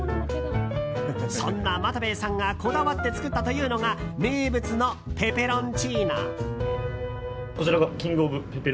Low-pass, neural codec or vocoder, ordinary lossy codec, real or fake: none; none; none; real